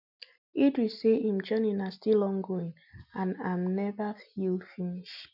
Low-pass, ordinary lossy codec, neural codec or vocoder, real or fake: 5.4 kHz; none; none; real